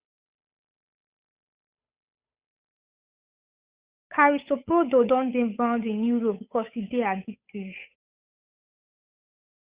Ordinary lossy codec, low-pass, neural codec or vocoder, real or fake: Opus, 64 kbps; 3.6 kHz; codec, 16 kHz, 8 kbps, FunCodec, trained on Chinese and English, 25 frames a second; fake